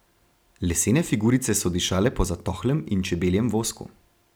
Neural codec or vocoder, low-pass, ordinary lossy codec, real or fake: none; none; none; real